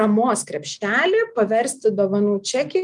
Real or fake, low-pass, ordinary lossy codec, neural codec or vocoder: real; 10.8 kHz; Opus, 32 kbps; none